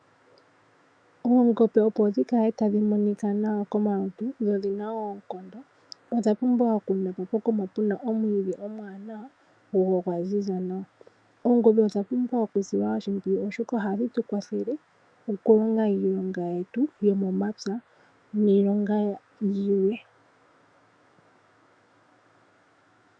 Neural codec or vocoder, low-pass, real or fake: autoencoder, 48 kHz, 128 numbers a frame, DAC-VAE, trained on Japanese speech; 9.9 kHz; fake